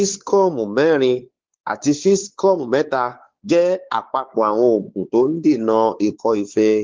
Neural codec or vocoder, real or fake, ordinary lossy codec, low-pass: codec, 16 kHz, 4 kbps, X-Codec, WavLM features, trained on Multilingual LibriSpeech; fake; Opus, 16 kbps; 7.2 kHz